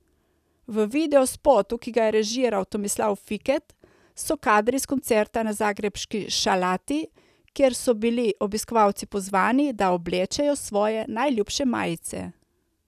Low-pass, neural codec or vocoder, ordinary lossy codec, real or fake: 14.4 kHz; none; none; real